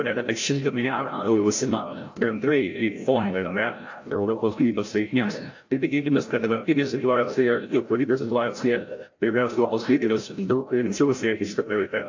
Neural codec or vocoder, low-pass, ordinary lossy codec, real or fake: codec, 16 kHz, 0.5 kbps, FreqCodec, larger model; 7.2 kHz; AAC, 48 kbps; fake